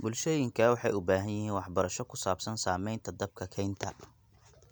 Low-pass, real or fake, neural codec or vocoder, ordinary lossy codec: none; real; none; none